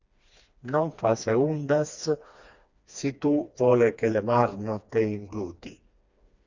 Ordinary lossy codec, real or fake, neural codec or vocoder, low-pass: Opus, 64 kbps; fake; codec, 16 kHz, 2 kbps, FreqCodec, smaller model; 7.2 kHz